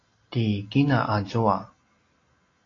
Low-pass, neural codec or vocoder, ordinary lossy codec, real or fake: 7.2 kHz; none; AAC, 32 kbps; real